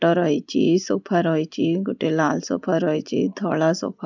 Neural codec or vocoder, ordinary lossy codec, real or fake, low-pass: none; none; real; 7.2 kHz